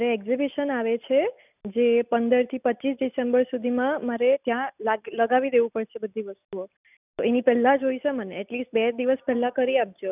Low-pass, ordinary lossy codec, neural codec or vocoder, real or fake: 3.6 kHz; none; none; real